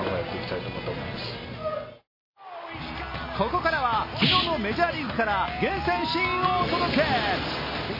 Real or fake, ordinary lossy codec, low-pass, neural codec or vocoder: real; MP3, 24 kbps; 5.4 kHz; none